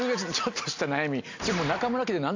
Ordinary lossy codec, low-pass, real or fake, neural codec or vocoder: AAC, 48 kbps; 7.2 kHz; real; none